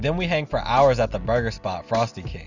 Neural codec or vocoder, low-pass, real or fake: none; 7.2 kHz; real